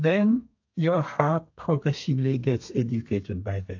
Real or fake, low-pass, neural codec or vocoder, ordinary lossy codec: fake; 7.2 kHz; codec, 32 kHz, 1.9 kbps, SNAC; AAC, 48 kbps